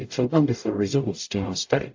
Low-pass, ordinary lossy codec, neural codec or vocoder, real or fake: 7.2 kHz; AAC, 48 kbps; codec, 44.1 kHz, 0.9 kbps, DAC; fake